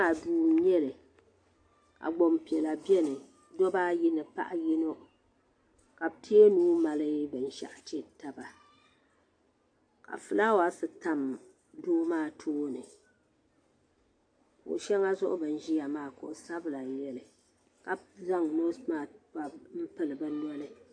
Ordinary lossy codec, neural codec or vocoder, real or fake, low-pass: AAC, 48 kbps; none; real; 9.9 kHz